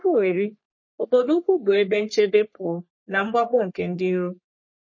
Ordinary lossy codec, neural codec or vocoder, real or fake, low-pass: MP3, 32 kbps; codec, 44.1 kHz, 3.4 kbps, Pupu-Codec; fake; 7.2 kHz